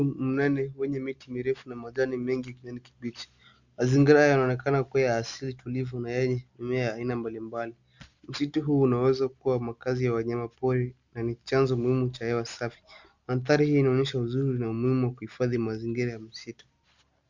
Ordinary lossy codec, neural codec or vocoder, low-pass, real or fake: Opus, 64 kbps; none; 7.2 kHz; real